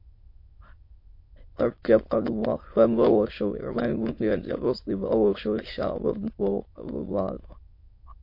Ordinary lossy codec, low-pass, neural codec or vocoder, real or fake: MP3, 32 kbps; 5.4 kHz; autoencoder, 22.05 kHz, a latent of 192 numbers a frame, VITS, trained on many speakers; fake